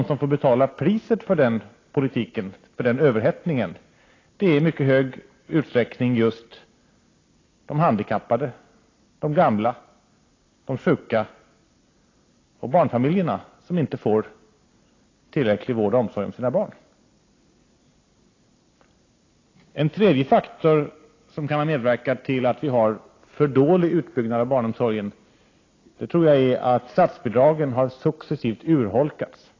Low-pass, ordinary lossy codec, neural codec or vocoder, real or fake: 7.2 kHz; AAC, 32 kbps; none; real